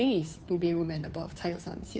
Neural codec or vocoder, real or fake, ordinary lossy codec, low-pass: codec, 16 kHz, 2 kbps, FunCodec, trained on Chinese and English, 25 frames a second; fake; none; none